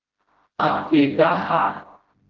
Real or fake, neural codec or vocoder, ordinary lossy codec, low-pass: fake; codec, 16 kHz, 0.5 kbps, FreqCodec, smaller model; Opus, 16 kbps; 7.2 kHz